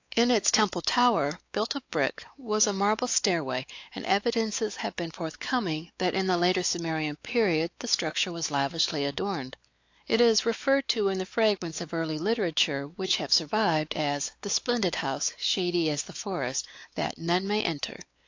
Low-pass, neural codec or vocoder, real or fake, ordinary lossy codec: 7.2 kHz; codec, 16 kHz, 4 kbps, X-Codec, WavLM features, trained on Multilingual LibriSpeech; fake; AAC, 48 kbps